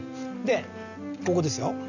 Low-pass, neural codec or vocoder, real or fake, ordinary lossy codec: 7.2 kHz; none; real; none